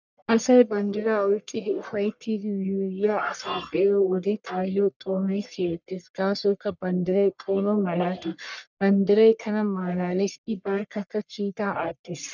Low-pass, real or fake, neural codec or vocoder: 7.2 kHz; fake; codec, 44.1 kHz, 1.7 kbps, Pupu-Codec